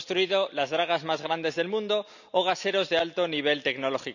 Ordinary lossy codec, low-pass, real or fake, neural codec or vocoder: none; 7.2 kHz; real; none